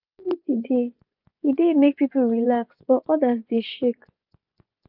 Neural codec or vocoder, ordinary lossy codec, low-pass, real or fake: vocoder, 24 kHz, 100 mel bands, Vocos; none; 5.4 kHz; fake